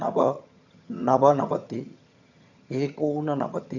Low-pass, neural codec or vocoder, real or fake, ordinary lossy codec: 7.2 kHz; vocoder, 22.05 kHz, 80 mel bands, HiFi-GAN; fake; none